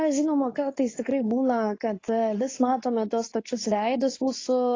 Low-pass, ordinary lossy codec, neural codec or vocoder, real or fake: 7.2 kHz; AAC, 32 kbps; codec, 24 kHz, 0.9 kbps, WavTokenizer, medium speech release version 2; fake